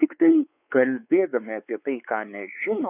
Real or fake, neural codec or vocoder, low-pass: fake; codec, 16 kHz, 4 kbps, X-Codec, WavLM features, trained on Multilingual LibriSpeech; 3.6 kHz